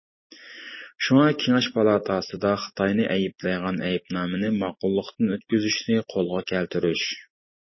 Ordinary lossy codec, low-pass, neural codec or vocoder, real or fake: MP3, 24 kbps; 7.2 kHz; none; real